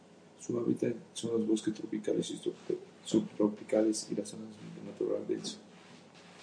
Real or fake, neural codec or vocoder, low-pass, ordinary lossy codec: real; none; 9.9 kHz; MP3, 48 kbps